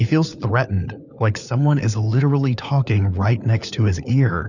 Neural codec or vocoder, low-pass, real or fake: codec, 16 kHz, 4 kbps, FunCodec, trained on LibriTTS, 50 frames a second; 7.2 kHz; fake